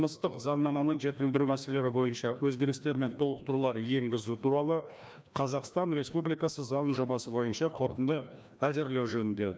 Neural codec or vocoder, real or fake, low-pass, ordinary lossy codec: codec, 16 kHz, 1 kbps, FreqCodec, larger model; fake; none; none